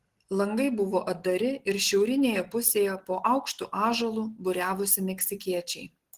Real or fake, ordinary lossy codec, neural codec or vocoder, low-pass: real; Opus, 16 kbps; none; 14.4 kHz